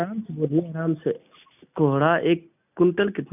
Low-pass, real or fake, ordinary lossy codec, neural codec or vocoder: 3.6 kHz; real; none; none